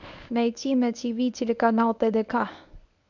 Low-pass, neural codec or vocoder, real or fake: 7.2 kHz; codec, 24 kHz, 0.9 kbps, WavTokenizer, medium speech release version 1; fake